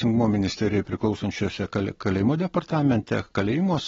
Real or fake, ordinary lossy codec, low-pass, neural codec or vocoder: real; AAC, 24 kbps; 7.2 kHz; none